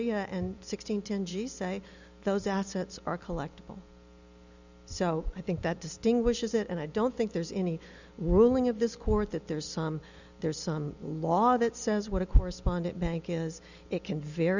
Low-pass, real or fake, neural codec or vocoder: 7.2 kHz; real; none